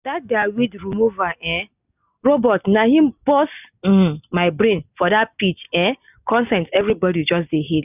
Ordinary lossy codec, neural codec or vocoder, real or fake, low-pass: none; none; real; 3.6 kHz